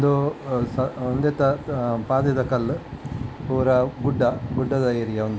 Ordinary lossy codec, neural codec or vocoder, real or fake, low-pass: none; none; real; none